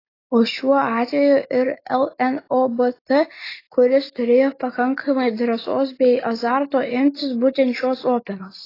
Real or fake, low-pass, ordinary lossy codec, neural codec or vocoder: real; 5.4 kHz; AAC, 24 kbps; none